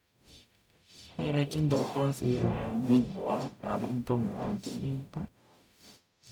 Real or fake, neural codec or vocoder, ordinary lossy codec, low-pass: fake; codec, 44.1 kHz, 0.9 kbps, DAC; none; 19.8 kHz